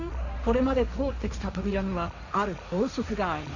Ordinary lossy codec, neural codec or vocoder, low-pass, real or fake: none; codec, 16 kHz, 1.1 kbps, Voila-Tokenizer; 7.2 kHz; fake